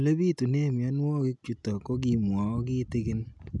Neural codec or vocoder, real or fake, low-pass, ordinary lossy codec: none; real; 10.8 kHz; none